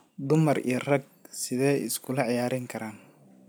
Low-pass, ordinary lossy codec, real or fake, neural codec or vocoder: none; none; real; none